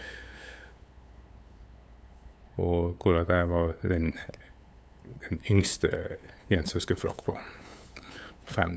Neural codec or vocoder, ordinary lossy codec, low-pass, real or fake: codec, 16 kHz, 8 kbps, FunCodec, trained on LibriTTS, 25 frames a second; none; none; fake